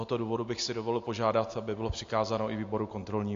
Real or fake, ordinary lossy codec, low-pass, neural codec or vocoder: real; AAC, 48 kbps; 7.2 kHz; none